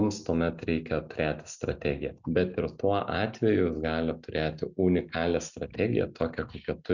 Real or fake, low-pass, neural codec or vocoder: real; 7.2 kHz; none